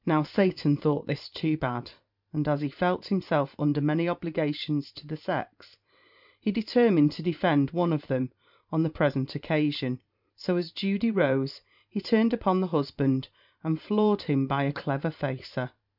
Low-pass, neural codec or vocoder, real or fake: 5.4 kHz; vocoder, 44.1 kHz, 128 mel bands every 512 samples, BigVGAN v2; fake